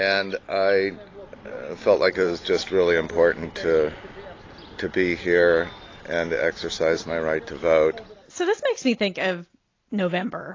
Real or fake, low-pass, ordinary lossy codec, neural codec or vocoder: real; 7.2 kHz; AAC, 32 kbps; none